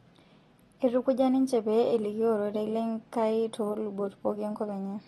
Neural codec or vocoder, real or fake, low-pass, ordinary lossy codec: none; real; 19.8 kHz; AAC, 32 kbps